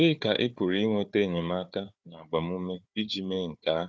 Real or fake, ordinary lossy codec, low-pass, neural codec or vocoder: fake; none; none; codec, 16 kHz, 4 kbps, FunCodec, trained on Chinese and English, 50 frames a second